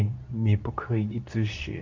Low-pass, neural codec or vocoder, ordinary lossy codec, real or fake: 7.2 kHz; codec, 24 kHz, 0.9 kbps, WavTokenizer, medium speech release version 2; MP3, 64 kbps; fake